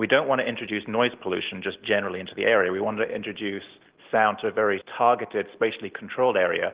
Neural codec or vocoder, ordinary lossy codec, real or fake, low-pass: none; Opus, 16 kbps; real; 3.6 kHz